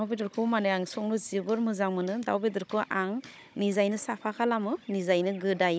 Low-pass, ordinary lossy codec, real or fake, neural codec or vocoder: none; none; fake; codec, 16 kHz, 16 kbps, FunCodec, trained on Chinese and English, 50 frames a second